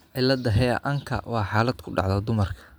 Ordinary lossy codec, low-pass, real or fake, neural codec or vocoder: none; none; real; none